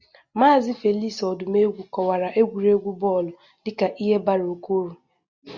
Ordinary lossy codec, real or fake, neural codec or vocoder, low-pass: Opus, 64 kbps; real; none; 7.2 kHz